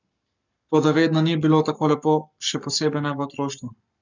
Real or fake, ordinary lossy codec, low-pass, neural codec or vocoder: fake; none; 7.2 kHz; codec, 44.1 kHz, 7.8 kbps, Pupu-Codec